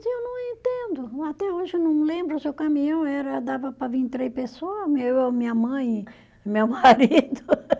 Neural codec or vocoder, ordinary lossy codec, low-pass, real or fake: none; none; none; real